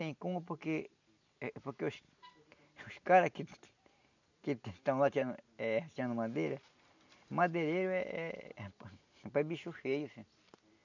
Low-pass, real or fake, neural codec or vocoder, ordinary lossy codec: 7.2 kHz; real; none; none